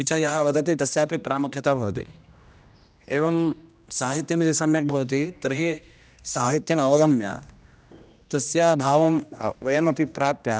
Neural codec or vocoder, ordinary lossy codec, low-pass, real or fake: codec, 16 kHz, 1 kbps, X-Codec, HuBERT features, trained on general audio; none; none; fake